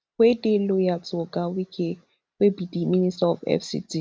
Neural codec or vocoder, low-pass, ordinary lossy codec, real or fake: none; none; none; real